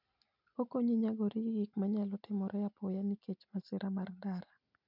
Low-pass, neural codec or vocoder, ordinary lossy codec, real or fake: 5.4 kHz; none; none; real